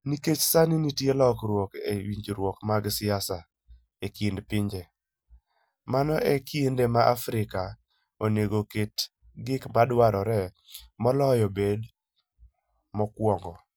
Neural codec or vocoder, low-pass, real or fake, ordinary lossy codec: none; none; real; none